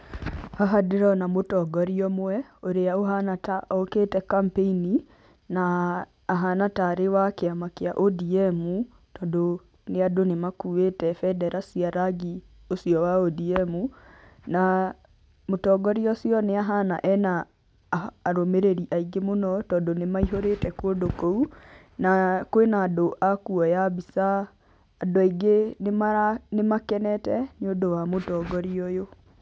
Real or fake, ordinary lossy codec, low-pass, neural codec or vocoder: real; none; none; none